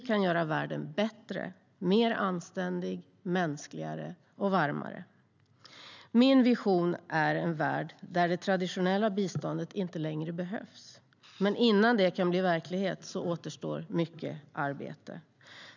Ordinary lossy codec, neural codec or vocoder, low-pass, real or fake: none; none; 7.2 kHz; real